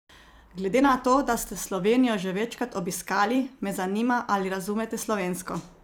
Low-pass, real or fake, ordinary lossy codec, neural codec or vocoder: none; fake; none; vocoder, 44.1 kHz, 128 mel bands every 256 samples, BigVGAN v2